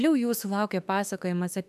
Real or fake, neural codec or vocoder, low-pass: fake; autoencoder, 48 kHz, 32 numbers a frame, DAC-VAE, trained on Japanese speech; 14.4 kHz